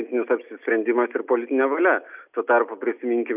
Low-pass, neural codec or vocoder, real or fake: 3.6 kHz; none; real